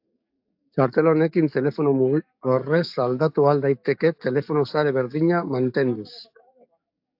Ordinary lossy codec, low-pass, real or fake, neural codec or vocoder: AAC, 48 kbps; 5.4 kHz; fake; codec, 44.1 kHz, 7.8 kbps, DAC